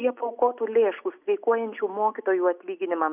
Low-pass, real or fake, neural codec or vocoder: 3.6 kHz; real; none